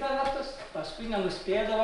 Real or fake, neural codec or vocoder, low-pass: real; none; 10.8 kHz